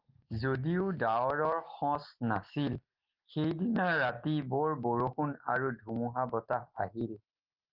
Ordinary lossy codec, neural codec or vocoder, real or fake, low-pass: Opus, 32 kbps; none; real; 5.4 kHz